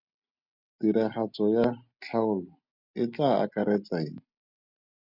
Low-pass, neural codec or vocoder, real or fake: 5.4 kHz; none; real